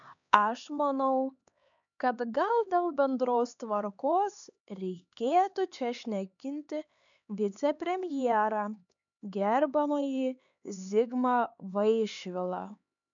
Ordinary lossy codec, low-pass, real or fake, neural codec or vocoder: MP3, 96 kbps; 7.2 kHz; fake; codec, 16 kHz, 4 kbps, X-Codec, HuBERT features, trained on LibriSpeech